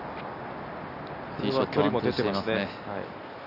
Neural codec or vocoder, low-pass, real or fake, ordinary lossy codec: none; 5.4 kHz; real; none